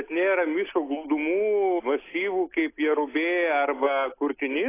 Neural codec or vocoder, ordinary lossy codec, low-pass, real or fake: none; AAC, 24 kbps; 3.6 kHz; real